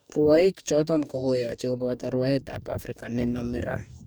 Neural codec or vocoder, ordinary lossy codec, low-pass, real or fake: codec, 44.1 kHz, 2.6 kbps, DAC; none; none; fake